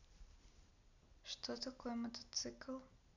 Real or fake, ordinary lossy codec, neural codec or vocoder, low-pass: real; none; none; 7.2 kHz